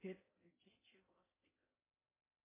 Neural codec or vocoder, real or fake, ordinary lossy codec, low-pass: codec, 16 kHz in and 24 kHz out, 0.6 kbps, FocalCodec, streaming, 4096 codes; fake; MP3, 16 kbps; 3.6 kHz